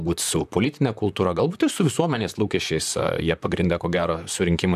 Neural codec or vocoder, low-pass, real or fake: vocoder, 44.1 kHz, 128 mel bands, Pupu-Vocoder; 14.4 kHz; fake